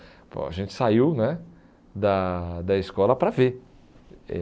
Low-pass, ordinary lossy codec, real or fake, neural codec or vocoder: none; none; real; none